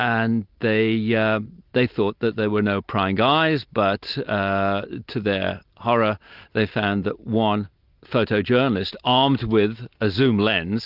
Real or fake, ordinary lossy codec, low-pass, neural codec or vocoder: real; Opus, 32 kbps; 5.4 kHz; none